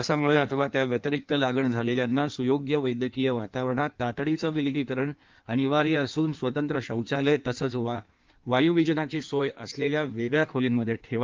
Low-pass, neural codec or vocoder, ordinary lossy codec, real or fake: 7.2 kHz; codec, 16 kHz in and 24 kHz out, 1.1 kbps, FireRedTTS-2 codec; Opus, 24 kbps; fake